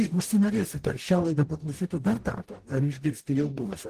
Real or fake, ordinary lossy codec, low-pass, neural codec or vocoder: fake; Opus, 16 kbps; 14.4 kHz; codec, 44.1 kHz, 0.9 kbps, DAC